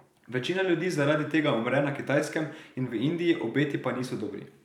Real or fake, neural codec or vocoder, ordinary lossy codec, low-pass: fake; vocoder, 44.1 kHz, 128 mel bands every 512 samples, BigVGAN v2; none; 19.8 kHz